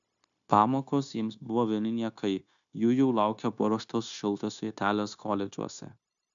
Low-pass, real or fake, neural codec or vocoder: 7.2 kHz; fake; codec, 16 kHz, 0.9 kbps, LongCat-Audio-Codec